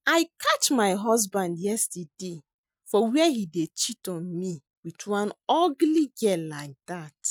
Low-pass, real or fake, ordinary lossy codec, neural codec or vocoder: none; real; none; none